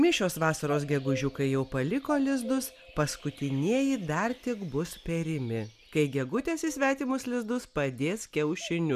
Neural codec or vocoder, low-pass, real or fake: none; 14.4 kHz; real